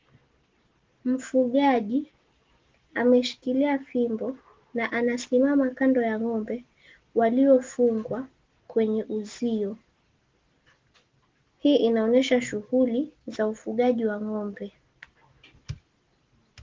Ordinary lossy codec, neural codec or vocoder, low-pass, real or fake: Opus, 16 kbps; none; 7.2 kHz; real